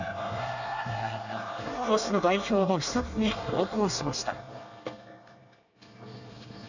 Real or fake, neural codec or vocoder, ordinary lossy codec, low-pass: fake; codec, 24 kHz, 1 kbps, SNAC; none; 7.2 kHz